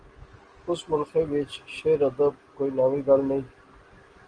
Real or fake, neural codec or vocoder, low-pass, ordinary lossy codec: real; none; 9.9 kHz; Opus, 24 kbps